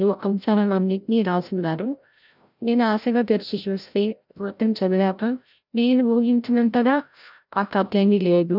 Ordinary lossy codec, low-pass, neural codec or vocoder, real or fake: none; 5.4 kHz; codec, 16 kHz, 0.5 kbps, FreqCodec, larger model; fake